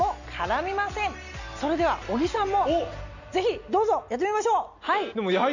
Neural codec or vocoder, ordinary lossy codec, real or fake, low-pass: none; none; real; 7.2 kHz